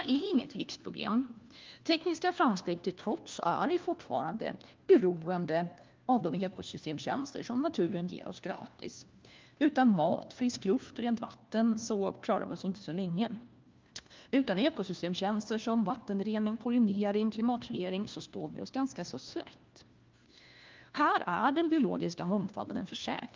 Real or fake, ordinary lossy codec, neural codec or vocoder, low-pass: fake; Opus, 24 kbps; codec, 16 kHz, 1 kbps, FunCodec, trained on LibriTTS, 50 frames a second; 7.2 kHz